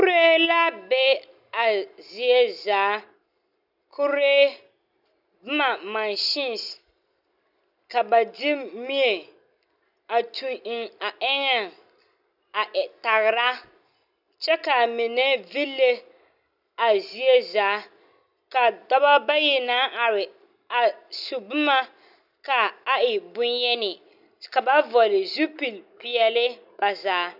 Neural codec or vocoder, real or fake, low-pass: none; real; 5.4 kHz